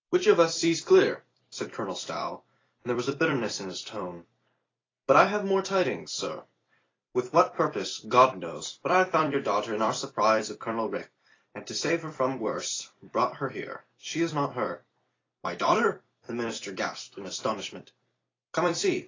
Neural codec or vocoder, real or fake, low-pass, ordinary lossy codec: vocoder, 44.1 kHz, 128 mel bands every 256 samples, BigVGAN v2; fake; 7.2 kHz; AAC, 32 kbps